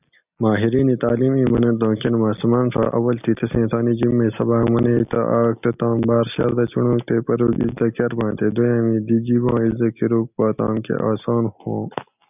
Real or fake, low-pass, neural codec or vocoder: real; 3.6 kHz; none